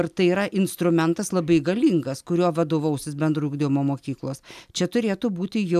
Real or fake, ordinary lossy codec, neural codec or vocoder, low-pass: real; AAC, 96 kbps; none; 14.4 kHz